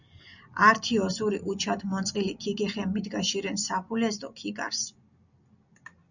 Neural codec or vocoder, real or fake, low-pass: none; real; 7.2 kHz